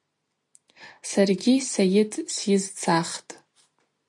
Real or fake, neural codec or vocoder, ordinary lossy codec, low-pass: real; none; MP3, 48 kbps; 10.8 kHz